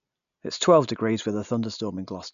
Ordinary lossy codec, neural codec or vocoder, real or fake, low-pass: none; none; real; 7.2 kHz